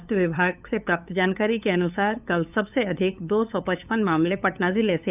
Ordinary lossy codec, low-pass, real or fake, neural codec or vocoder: none; 3.6 kHz; fake; codec, 16 kHz, 8 kbps, FunCodec, trained on LibriTTS, 25 frames a second